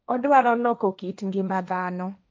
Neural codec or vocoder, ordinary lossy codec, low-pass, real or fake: codec, 16 kHz, 1.1 kbps, Voila-Tokenizer; none; none; fake